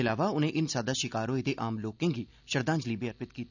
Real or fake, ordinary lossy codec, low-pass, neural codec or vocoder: real; none; 7.2 kHz; none